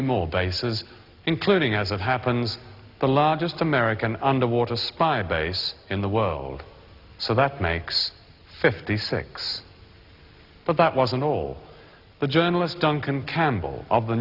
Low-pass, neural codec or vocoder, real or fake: 5.4 kHz; none; real